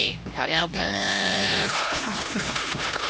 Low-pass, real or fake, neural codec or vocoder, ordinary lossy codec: none; fake; codec, 16 kHz, 1 kbps, X-Codec, HuBERT features, trained on LibriSpeech; none